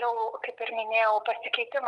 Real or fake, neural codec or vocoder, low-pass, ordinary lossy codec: real; none; 10.8 kHz; Opus, 32 kbps